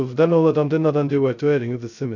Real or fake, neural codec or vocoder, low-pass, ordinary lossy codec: fake; codec, 16 kHz, 0.2 kbps, FocalCodec; 7.2 kHz; none